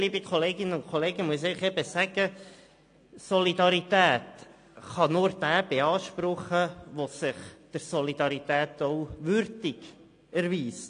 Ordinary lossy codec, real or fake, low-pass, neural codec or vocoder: AAC, 48 kbps; real; 9.9 kHz; none